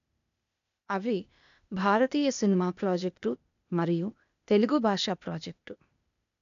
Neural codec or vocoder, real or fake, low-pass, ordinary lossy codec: codec, 16 kHz, 0.8 kbps, ZipCodec; fake; 7.2 kHz; none